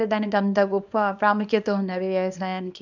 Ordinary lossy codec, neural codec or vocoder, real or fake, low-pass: none; codec, 24 kHz, 0.9 kbps, WavTokenizer, small release; fake; 7.2 kHz